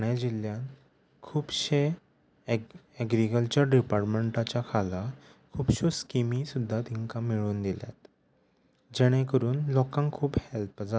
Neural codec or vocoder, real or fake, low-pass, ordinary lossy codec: none; real; none; none